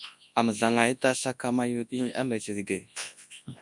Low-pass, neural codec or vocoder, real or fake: 10.8 kHz; codec, 24 kHz, 0.9 kbps, WavTokenizer, large speech release; fake